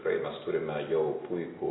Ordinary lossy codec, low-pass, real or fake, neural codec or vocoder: AAC, 16 kbps; 7.2 kHz; real; none